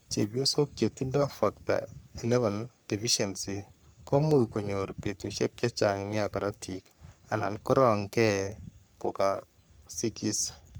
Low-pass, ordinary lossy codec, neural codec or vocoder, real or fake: none; none; codec, 44.1 kHz, 3.4 kbps, Pupu-Codec; fake